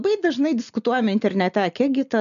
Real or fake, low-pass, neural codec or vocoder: real; 7.2 kHz; none